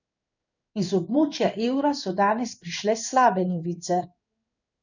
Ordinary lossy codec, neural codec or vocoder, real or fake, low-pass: none; codec, 16 kHz in and 24 kHz out, 1 kbps, XY-Tokenizer; fake; 7.2 kHz